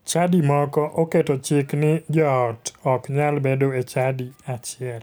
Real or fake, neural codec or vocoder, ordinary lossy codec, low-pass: real; none; none; none